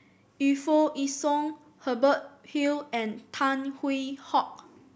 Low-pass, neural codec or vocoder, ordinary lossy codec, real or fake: none; none; none; real